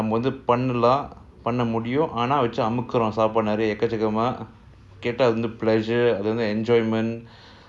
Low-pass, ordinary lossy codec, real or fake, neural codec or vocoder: none; none; real; none